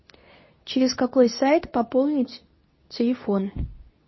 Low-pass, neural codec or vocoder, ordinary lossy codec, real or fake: 7.2 kHz; codec, 24 kHz, 0.9 kbps, WavTokenizer, medium speech release version 2; MP3, 24 kbps; fake